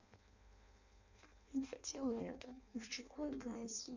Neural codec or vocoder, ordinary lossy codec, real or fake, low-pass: codec, 16 kHz in and 24 kHz out, 0.6 kbps, FireRedTTS-2 codec; none; fake; 7.2 kHz